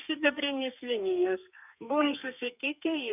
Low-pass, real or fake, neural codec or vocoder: 3.6 kHz; fake; codec, 32 kHz, 1.9 kbps, SNAC